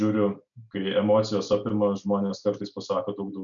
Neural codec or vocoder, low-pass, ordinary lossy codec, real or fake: none; 7.2 kHz; MP3, 96 kbps; real